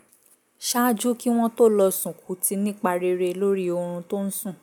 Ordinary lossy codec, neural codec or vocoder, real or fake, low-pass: none; none; real; none